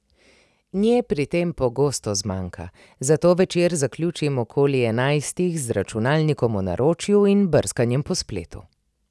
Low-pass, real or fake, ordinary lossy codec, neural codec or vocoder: none; real; none; none